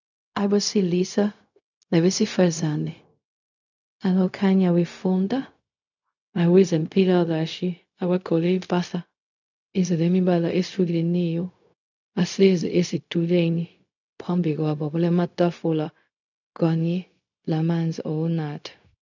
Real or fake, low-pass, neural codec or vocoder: fake; 7.2 kHz; codec, 16 kHz, 0.4 kbps, LongCat-Audio-Codec